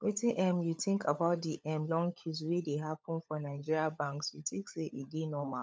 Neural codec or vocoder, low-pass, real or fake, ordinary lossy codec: codec, 16 kHz, 16 kbps, FunCodec, trained on LibriTTS, 50 frames a second; none; fake; none